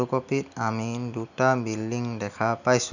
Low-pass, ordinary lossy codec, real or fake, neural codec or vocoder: 7.2 kHz; none; real; none